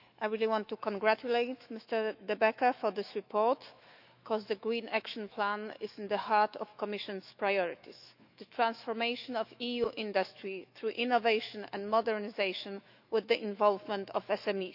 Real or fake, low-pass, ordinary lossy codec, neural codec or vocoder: fake; 5.4 kHz; none; autoencoder, 48 kHz, 128 numbers a frame, DAC-VAE, trained on Japanese speech